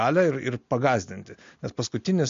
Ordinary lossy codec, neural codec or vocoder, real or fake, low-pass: MP3, 48 kbps; none; real; 7.2 kHz